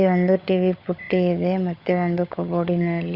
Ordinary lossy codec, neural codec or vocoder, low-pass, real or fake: Opus, 64 kbps; codec, 16 kHz, 16 kbps, FunCodec, trained on LibriTTS, 50 frames a second; 5.4 kHz; fake